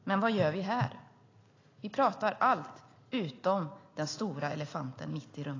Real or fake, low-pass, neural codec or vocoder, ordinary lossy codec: real; 7.2 kHz; none; AAC, 32 kbps